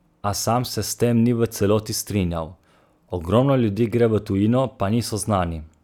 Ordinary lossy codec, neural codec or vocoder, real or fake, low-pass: none; none; real; 19.8 kHz